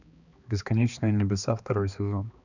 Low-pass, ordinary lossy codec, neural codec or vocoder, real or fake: 7.2 kHz; none; codec, 16 kHz, 2 kbps, X-Codec, HuBERT features, trained on balanced general audio; fake